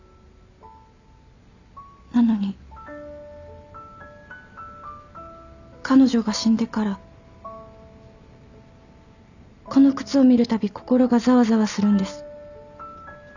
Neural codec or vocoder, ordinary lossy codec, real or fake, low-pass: none; none; real; 7.2 kHz